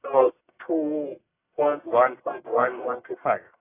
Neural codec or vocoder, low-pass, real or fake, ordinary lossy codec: codec, 44.1 kHz, 1.7 kbps, Pupu-Codec; 3.6 kHz; fake; AAC, 24 kbps